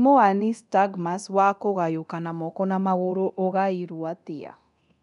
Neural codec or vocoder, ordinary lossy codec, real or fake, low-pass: codec, 24 kHz, 0.9 kbps, DualCodec; none; fake; 10.8 kHz